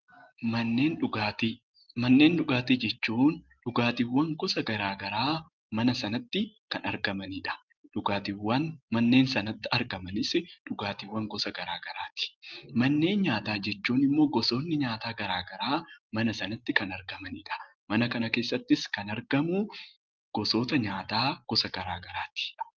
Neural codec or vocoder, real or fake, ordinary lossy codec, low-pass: none; real; Opus, 32 kbps; 7.2 kHz